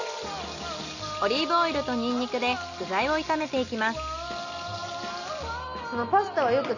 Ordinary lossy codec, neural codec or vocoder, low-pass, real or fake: none; none; 7.2 kHz; real